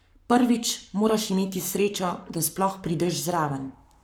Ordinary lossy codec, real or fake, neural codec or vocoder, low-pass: none; fake; codec, 44.1 kHz, 7.8 kbps, Pupu-Codec; none